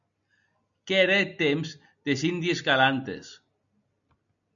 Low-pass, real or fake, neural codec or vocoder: 7.2 kHz; real; none